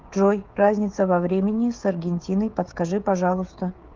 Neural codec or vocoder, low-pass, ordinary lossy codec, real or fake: codec, 16 kHz, 16 kbps, FreqCodec, smaller model; 7.2 kHz; Opus, 32 kbps; fake